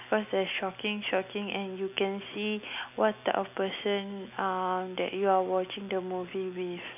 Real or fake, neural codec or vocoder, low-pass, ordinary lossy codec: real; none; 3.6 kHz; none